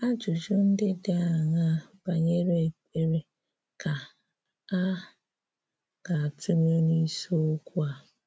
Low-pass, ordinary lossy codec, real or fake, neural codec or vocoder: none; none; real; none